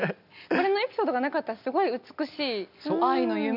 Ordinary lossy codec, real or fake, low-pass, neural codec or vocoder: none; real; 5.4 kHz; none